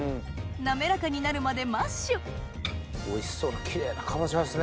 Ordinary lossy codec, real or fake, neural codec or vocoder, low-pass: none; real; none; none